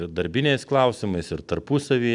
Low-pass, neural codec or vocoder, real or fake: 10.8 kHz; none; real